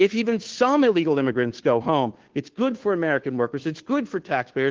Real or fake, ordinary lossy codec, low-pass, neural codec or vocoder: fake; Opus, 16 kbps; 7.2 kHz; codec, 16 kHz, 2 kbps, FunCodec, trained on Chinese and English, 25 frames a second